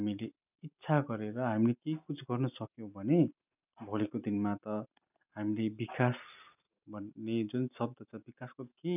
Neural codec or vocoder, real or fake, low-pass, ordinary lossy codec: none; real; 3.6 kHz; none